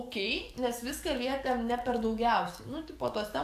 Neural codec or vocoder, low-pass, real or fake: codec, 44.1 kHz, 7.8 kbps, DAC; 14.4 kHz; fake